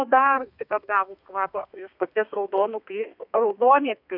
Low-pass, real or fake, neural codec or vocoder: 5.4 kHz; fake; codec, 32 kHz, 1.9 kbps, SNAC